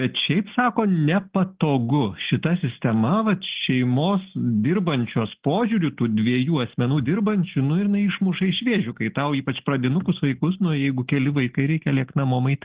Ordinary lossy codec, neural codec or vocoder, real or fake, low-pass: Opus, 16 kbps; none; real; 3.6 kHz